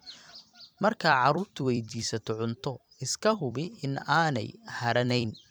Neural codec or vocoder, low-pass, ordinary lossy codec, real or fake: vocoder, 44.1 kHz, 128 mel bands every 256 samples, BigVGAN v2; none; none; fake